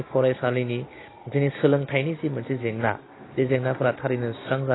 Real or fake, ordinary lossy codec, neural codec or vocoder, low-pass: real; AAC, 16 kbps; none; 7.2 kHz